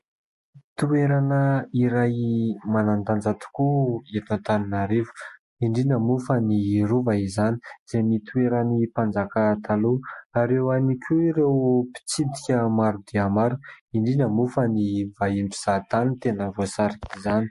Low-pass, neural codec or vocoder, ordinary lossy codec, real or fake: 14.4 kHz; none; MP3, 48 kbps; real